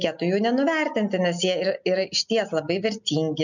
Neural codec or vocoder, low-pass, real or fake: none; 7.2 kHz; real